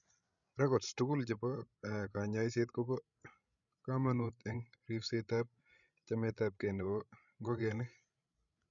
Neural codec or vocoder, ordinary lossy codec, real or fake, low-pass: codec, 16 kHz, 16 kbps, FreqCodec, larger model; none; fake; 7.2 kHz